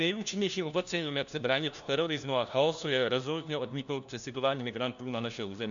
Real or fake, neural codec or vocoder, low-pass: fake; codec, 16 kHz, 1 kbps, FunCodec, trained on LibriTTS, 50 frames a second; 7.2 kHz